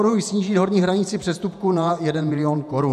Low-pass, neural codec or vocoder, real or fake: 14.4 kHz; vocoder, 48 kHz, 128 mel bands, Vocos; fake